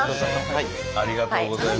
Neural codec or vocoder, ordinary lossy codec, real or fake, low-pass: none; none; real; none